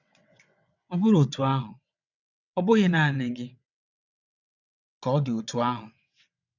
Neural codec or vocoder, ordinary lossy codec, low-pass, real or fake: vocoder, 22.05 kHz, 80 mel bands, WaveNeXt; none; 7.2 kHz; fake